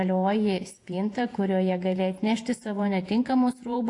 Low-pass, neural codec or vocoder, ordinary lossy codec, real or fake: 10.8 kHz; vocoder, 48 kHz, 128 mel bands, Vocos; AAC, 48 kbps; fake